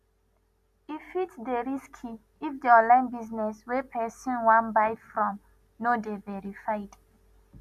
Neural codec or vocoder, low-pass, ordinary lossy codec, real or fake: none; 14.4 kHz; none; real